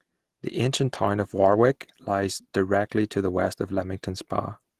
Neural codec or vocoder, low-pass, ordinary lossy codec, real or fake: none; 14.4 kHz; Opus, 16 kbps; real